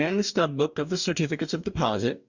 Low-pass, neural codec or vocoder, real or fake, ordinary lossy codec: 7.2 kHz; codec, 44.1 kHz, 2.6 kbps, DAC; fake; Opus, 64 kbps